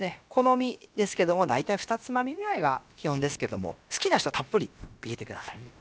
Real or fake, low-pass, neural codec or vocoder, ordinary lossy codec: fake; none; codec, 16 kHz, about 1 kbps, DyCAST, with the encoder's durations; none